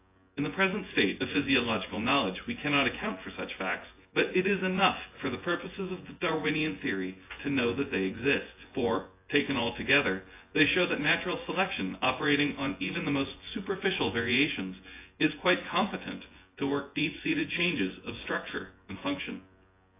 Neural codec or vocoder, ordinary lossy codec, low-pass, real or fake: vocoder, 24 kHz, 100 mel bands, Vocos; AAC, 24 kbps; 3.6 kHz; fake